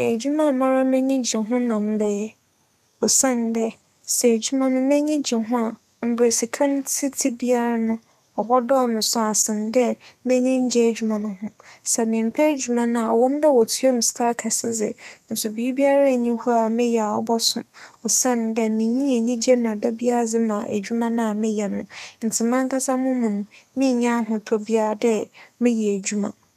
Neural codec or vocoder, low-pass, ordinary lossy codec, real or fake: codec, 32 kHz, 1.9 kbps, SNAC; 14.4 kHz; none; fake